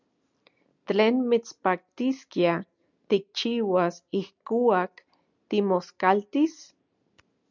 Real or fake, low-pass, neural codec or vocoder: real; 7.2 kHz; none